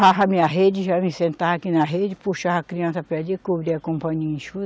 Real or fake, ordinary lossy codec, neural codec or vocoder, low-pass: real; none; none; none